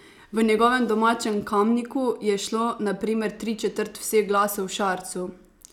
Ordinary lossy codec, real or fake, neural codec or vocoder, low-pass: none; real; none; 19.8 kHz